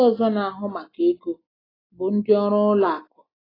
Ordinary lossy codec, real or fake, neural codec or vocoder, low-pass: AAC, 24 kbps; real; none; 5.4 kHz